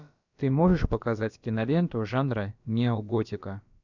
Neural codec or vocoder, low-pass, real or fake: codec, 16 kHz, about 1 kbps, DyCAST, with the encoder's durations; 7.2 kHz; fake